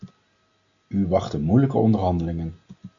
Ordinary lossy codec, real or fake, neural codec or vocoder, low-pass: AAC, 64 kbps; real; none; 7.2 kHz